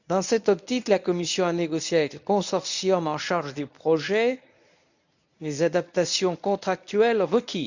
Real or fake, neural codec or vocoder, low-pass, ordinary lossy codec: fake; codec, 24 kHz, 0.9 kbps, WavTokenizer, medium speech release version 1; 7.2 kHz; none